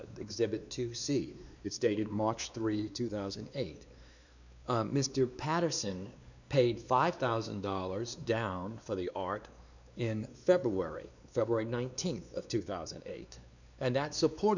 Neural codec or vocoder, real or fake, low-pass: codec, 16 kHz, 2 kbps, X-Codec, WavLM features, trained on Multilingual LibriSpeech; fake; 7.2 kHz